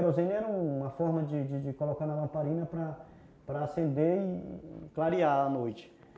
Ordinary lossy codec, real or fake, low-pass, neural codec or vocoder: none; real; none; none